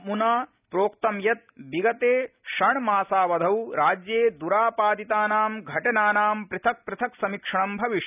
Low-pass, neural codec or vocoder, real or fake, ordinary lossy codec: 3.6 kHz; none; real; none